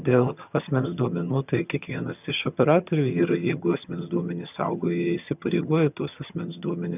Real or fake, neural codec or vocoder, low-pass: fake; vocoder, 22.05 kHz, 80 mel bands, HiFi-GAN; 3.6 kHz